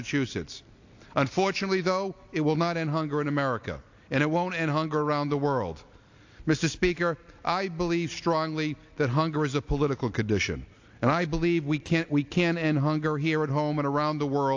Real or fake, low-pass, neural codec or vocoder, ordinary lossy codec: real; 7.2 kHz; none; AAC, 48 kbps